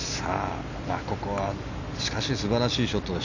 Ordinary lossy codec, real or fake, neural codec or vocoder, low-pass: none; real; none; 7.2 kHz